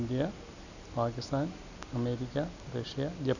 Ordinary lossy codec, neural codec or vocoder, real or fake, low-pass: none; none; real; 7.2 kHz